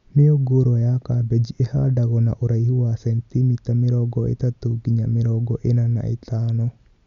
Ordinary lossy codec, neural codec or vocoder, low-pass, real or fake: Opus, 64 kbps; none; 7.2 kHz; real